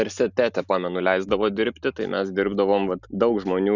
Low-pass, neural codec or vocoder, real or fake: 7.2 kHz; none; real